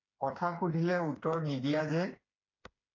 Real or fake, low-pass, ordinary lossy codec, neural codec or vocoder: fake; 7.2 kHz; AAC, 32 kbps; codec, 16 kHz, 4 kbps, FreqCodec, smaller model